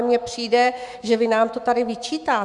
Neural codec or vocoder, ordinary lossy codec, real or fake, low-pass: autoencoder, 48 kHz, 128 numbers a frame, DAC-VAE, trained on Japanese speech; Opus, 64 kbps; fake; 10.8 kHz